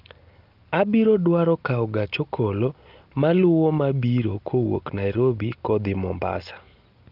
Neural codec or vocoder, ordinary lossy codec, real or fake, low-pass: none; Opus, 32 kbps; real; 5.4 kHz